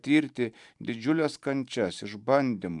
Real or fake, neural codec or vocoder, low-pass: fake; vocoder, 44.1 kHz, 128 mel bands every 512 samples, BigVGAN v2; 10.8 kHz